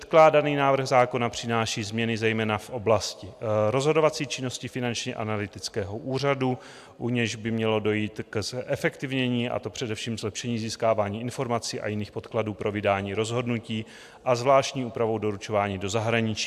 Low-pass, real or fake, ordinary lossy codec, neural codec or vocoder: 14.4 kHz; real; AAC, 96 kbps; none